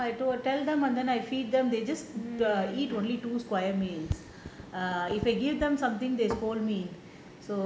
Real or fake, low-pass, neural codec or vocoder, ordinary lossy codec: real; none; none; none